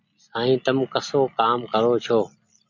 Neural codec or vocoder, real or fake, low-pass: none; real; 7.2 kHz